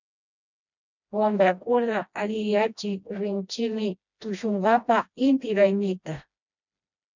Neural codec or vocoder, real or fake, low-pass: codec, 16 kHz, 1 kbps, FreqCodec, smaller model; fake; 7.2 kHz